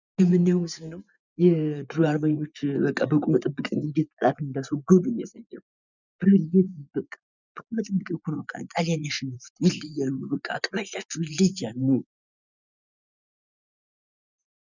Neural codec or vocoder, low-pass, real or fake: vocoder, 24 kHz, 100 mel bands, Vocos; 7.2 kHz; fake